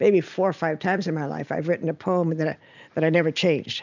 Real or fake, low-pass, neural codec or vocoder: real; 7.2 kHz; none